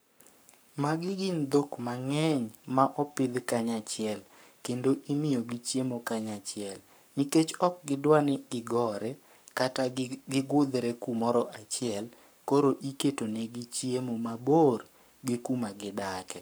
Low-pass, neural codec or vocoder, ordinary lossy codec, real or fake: none; codec, 44.1 kHz, 7.8 kbps, Pupu-Codec; none; fake